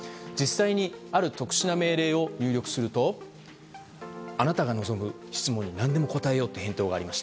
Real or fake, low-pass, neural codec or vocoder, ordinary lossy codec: real; none; none; none